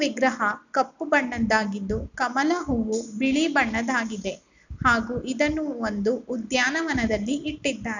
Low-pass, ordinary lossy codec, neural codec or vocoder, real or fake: 7.2 kHz; none; none; real